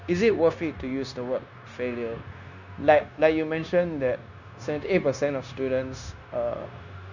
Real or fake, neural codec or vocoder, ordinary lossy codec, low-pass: fake; codec, 16 kHz, 0.9 kbps, LongCat-Audio-Codec; none; 7.2 kHz